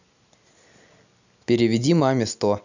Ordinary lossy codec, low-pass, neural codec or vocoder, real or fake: none; 7.2 kHz; none; real